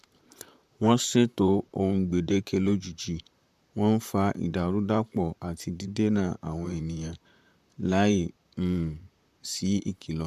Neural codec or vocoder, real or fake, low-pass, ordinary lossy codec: vocoder, 44.1 kHz, 128 mel bands, Pupu-Vocoder; fake; 14.4 kHz; MP3, 96 kbps